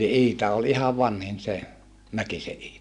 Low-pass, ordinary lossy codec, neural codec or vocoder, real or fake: 10.8 kHz; none; none; real